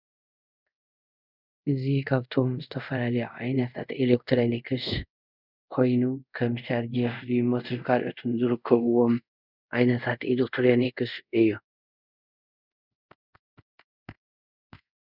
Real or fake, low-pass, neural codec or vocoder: fake; 5.4 kHz; codec, 24 kHz, 0.5 kbps, DualCodec